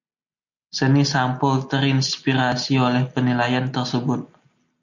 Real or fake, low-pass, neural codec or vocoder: real; 7.2 kHz; none